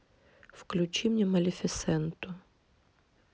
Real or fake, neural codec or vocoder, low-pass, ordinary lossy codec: real; none; none; none